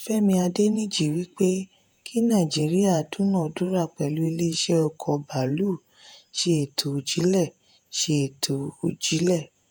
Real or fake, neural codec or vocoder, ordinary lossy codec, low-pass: fake; vocoder, 48 kHz, 128 mel bands, Vocos; none; none